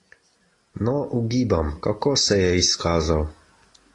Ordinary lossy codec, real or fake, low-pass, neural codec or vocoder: AAC, 32 kbps; real; 10.8 kHz; none